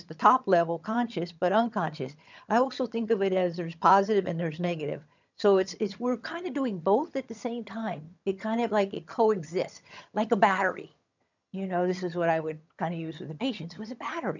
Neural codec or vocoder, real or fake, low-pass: vocoder, 22.05 kHz, 80 mel bands, HiFi-GAN; fake; 7.2 kHz